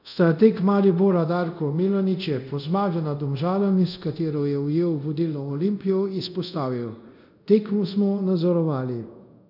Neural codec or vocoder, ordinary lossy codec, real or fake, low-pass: codec, 24 kHz, 0.5 kbps, DualCodec; none; fake; 5.4 kHz